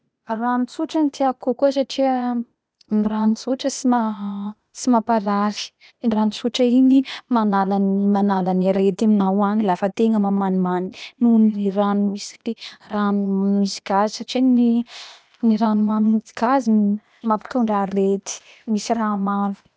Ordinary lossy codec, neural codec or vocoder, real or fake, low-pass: none; codec, 16 kHz, 0.8 kbps, ZipCodec; fake; none